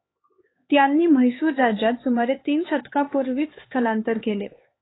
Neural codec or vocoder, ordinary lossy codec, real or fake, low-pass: codec, 16 kHz, 4 kbps, X-Codec, HuBERT features, trained on LibriSpeech; AAC, 16 kbps; fake; 7.2 kHz